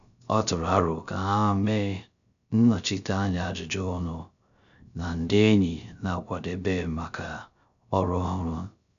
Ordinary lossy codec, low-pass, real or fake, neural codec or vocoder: none; 7.2 kHz; fake; codec, 16 kHz, 0.3 kbps, FocalCodec